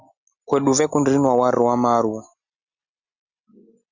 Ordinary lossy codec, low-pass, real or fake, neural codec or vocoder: Opus, 64 kbps; 7.2 kHz; real; none